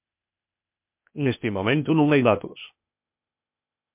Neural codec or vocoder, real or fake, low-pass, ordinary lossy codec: codec, 16 kHz, 0.8 kbps, ZipCodec; fake; 3.6 kHz; MP3, 32 kbps